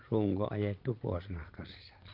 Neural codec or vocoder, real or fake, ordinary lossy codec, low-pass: vocoder, 22.05 kHz, 80 mel bands, Vocos; fake; Opus, 24 kbps; 5.4 kHz